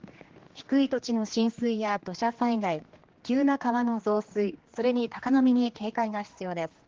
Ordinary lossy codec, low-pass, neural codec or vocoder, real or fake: Opus, 16 kbps; 7.2 kHz; codec, 16 kHz, 2 kbps, X-Codec, HuBERT features, trained on general audio; fake